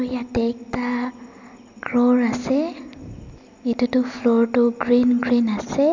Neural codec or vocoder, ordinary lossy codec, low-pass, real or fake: none; MP3, 64 kbps; 7.2 kHz; real